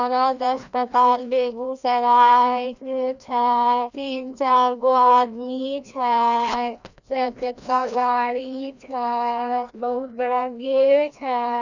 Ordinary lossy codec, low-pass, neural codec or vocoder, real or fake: none; 7.2 kHz; codec, 16 kHz, 1 kbps, FreqCodec, larger model; fake